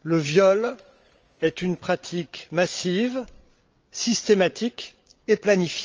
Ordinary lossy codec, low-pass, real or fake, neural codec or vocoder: Opus, 24 kbps; 7.2 kHz; fake; codec, 16 kHz, 8 kbps, FreqCodec, larger model